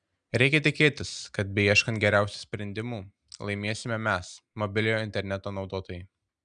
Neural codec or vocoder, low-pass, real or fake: none; 9.9 kHz; real